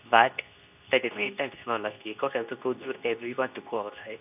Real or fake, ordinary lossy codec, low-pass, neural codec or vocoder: fake; none; 3.6 kHz; codec, 24 kHz, 0.9 kbps, WavTokenizer, medium speech release version 2